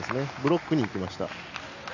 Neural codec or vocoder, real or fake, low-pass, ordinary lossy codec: none; real; 7.2 kHz; none